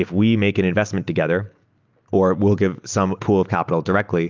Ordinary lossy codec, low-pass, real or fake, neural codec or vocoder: Opus, 24 kbps; 7.2 kHz; real; none